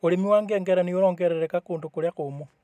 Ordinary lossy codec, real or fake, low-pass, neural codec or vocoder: none; real; 14.4 kHz; none